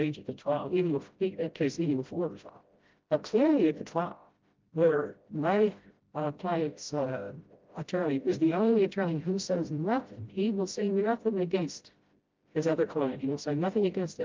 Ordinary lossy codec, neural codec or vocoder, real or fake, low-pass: Opus, 32 kbps; codec, 16 kHz, 0.5 kbps, FreqCodec, smaller model; fake; 7.2 kHz